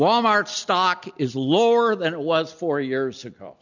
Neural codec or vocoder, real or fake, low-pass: none; real; 7.2 kHz